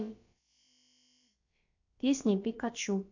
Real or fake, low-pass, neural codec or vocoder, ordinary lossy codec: fake; 7.2 kHz; codec, 16 kHz, about 1 kbps, DyCAST, with the encoder's durations; AAC, 48 kbps